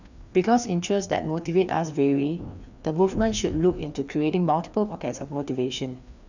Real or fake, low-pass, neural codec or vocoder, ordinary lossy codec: fake; 7.2 kHz; codec, 16 kHz, 2 kbps, FreqCodec, larger model; none